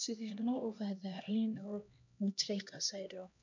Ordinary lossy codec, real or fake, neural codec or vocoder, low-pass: MP3, 64 kbps; fake; codec, 16 kHz, 1 kbps, X-Codec, HuBERT features, trained on LibriSpeech; 7.2 kHz